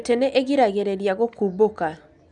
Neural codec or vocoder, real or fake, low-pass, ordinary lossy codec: none; real; 9.9 kHz; none